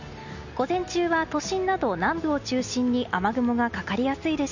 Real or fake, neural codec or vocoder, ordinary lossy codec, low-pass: real; none; none; 7.2 kHz